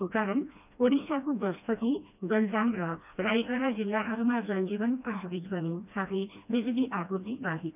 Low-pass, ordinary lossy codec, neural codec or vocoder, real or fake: 3.6 kHz; none; codec, 16 kHz, 1 kbps, FreqCodec, smaller model; fake